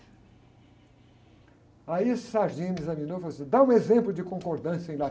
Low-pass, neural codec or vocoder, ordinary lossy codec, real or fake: none; none; none; real